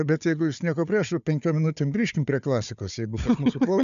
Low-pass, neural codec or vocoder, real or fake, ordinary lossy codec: 7.2 kHz; codec, 16 kHz, 4 kbps, FunCodec, trained on Chinese and English, 50 frames a second; fake; MP3, 96 kbps